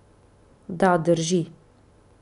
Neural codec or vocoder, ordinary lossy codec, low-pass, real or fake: none; none; 10.8 kHz; real